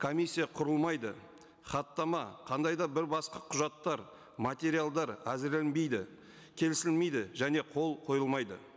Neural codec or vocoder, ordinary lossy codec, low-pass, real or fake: none; none; none; real